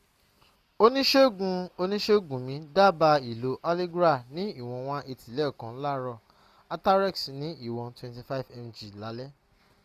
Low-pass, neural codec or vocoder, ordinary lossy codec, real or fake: 14.4 kHz; none; none; real